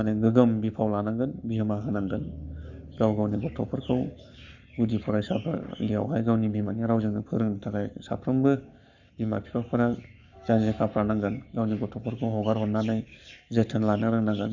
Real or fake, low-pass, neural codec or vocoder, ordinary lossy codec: fake; 7.2 kHz; codec, 44.1 kHz, 7.8 kbps, Pupu-Codec; none